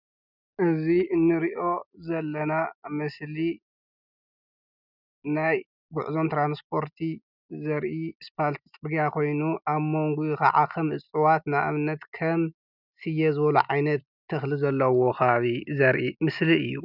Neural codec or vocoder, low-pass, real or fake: none; 5.4 kHz; real